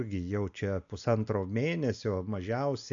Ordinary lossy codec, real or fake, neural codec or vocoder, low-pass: AAC, 64 kbps; real; none; 7.2 kHz